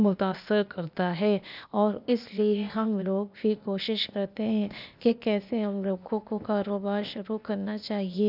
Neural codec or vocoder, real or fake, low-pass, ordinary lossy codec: codec, 16 kHz, 0.8 kbps, ZipCodec; fake; 5.4 kHz; none